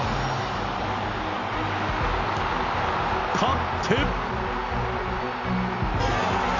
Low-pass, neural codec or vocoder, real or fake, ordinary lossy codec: 7.2 kHz; none; real; none